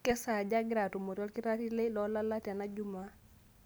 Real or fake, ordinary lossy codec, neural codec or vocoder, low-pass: real; none; none; none